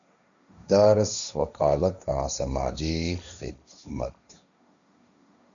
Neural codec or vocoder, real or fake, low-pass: codec, 16 kHz, 1.1 kbps, Voila-Tokenizer; fake; 7.2 kHz